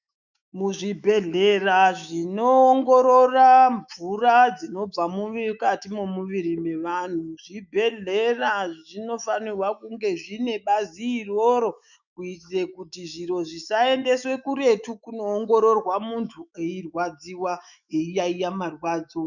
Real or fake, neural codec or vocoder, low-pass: fake; autoencoder, 48 kHz, 128 numbers a frame, DAC-VAE, trained on Japanese speech; 7.2 kHz